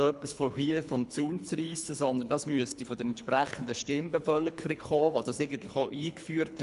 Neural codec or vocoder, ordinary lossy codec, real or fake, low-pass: codec, 24 kHz, 3 kbps, HILCodec; none; fake; 10.8 kHz